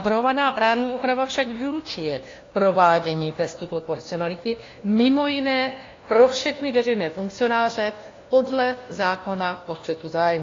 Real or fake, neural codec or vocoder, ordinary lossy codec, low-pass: fake; codec, 16 kHz, 1 kbps, FunCodec, trained on LibriTTS, 50 frames a second; AAC, 32 kbps; 7.2 kHz